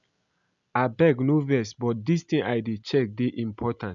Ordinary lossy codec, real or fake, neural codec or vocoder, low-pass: none; real; none; 7.2 kHz